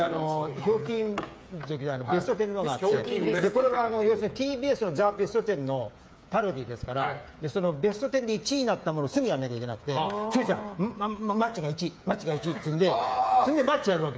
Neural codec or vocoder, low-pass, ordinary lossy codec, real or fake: codec, 16 kHz, 8 kbps, FreqCodec, smaller model; none; none; fake